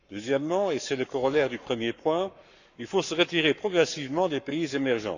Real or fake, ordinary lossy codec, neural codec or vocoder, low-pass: fake; none; codec, 44.1 kHz, 7.8 kbps, Pupu-Codec; 7.2 kHz